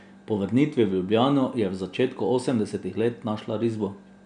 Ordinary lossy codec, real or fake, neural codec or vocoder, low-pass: none; real; none; 9.9 kHz